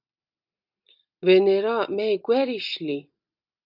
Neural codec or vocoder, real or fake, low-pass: none; real; 5.4 kHz